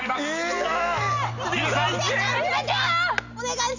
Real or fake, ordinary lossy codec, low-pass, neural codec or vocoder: real; none; 7.2 kHz; none